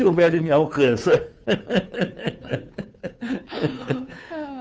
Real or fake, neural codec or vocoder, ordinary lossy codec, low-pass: fake; codec, 16 kHz, 2 kbps, FunCodec, trained on Chinese and English, 25 frames a second; none; none